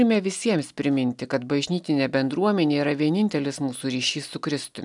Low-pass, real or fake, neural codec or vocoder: 10.8 kHz; real; none